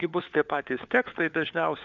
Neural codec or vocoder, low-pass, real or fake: codec, 16 kHz, 4 kbps, FunCodec, trained on LibriTTS, 50 frames a second; 7.2 kHz; fake